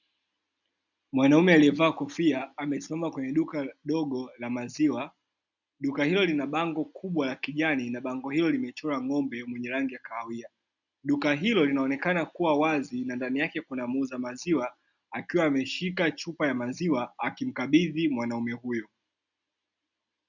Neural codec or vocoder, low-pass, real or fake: none; 7.2 kHz; real